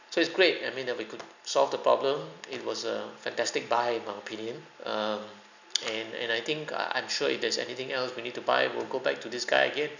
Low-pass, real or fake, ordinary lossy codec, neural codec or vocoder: 7.2 kHz; real; none; none